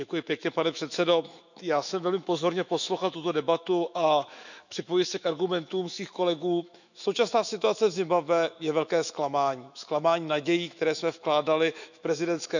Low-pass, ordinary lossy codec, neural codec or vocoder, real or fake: 7.2 kHz; none; autoencoder, 48 kHz, 128 numbers a frame, DAC-VAE, trained on Japanese speech; fake